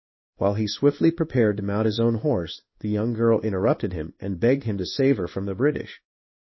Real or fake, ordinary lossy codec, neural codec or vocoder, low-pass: fake; MP3, 24 kbps; codec, 16 kHz in and 24 kHz out, 1 kbps, XY-Tokenizer; 7.2 kHz